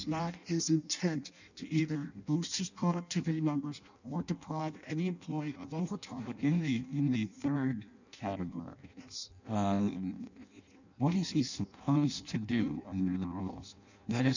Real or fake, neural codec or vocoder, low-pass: fake; codec, 16 kHz in and 24 kHz out, 0.6 kbps, FireRedTTS-2 codec; 7.2 kHz